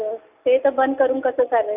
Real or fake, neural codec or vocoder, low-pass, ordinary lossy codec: real; none; 3.6 kHz; none